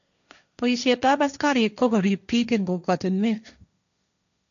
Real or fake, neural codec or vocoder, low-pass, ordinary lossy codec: fake; codec, 16 kHz, 1.1 kbps, Voila-Tokenizer; 7.2 kHz; none